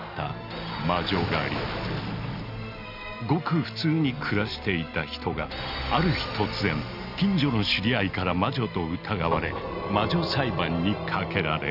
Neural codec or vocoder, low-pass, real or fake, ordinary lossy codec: none; 5.4 kHz; real; none